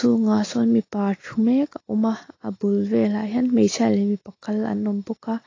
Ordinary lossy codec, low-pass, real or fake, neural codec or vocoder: AAC, 32 kbps; 7.2 kHz; real; none